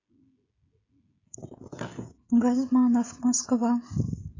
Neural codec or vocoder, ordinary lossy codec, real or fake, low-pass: codec, 16 kHz, 16 kbps, FreqCodec, smaller model; AAC, 32 kbps; fake; 7.2 kHz